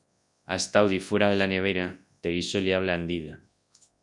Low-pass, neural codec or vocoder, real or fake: 10.8 kHz; codec, 24 kHz, 0.9 kbps, WavTokenizer, large speech release; fake